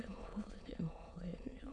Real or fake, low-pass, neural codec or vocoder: fake; 9.9 kHz; autoencoder, 22.05 kHz, a latent of 192 numbers a frame, VITS, trained on many speakers